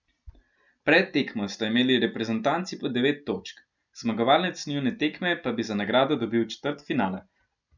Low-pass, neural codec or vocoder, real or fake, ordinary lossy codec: 7.2 kHz; none; real; none